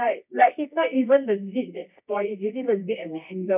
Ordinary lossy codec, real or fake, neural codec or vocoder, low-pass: none; fake; codec, 24 kHz, 0.9 kbps, WavTokenizer, medium music audio release; 3.6 kHz